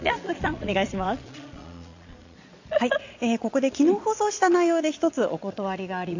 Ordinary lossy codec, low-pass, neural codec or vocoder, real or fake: AAC, 48 kbps; 7.2 kHz; vocoder, 22.05 kHz, 80 mel bands, Vocos; fake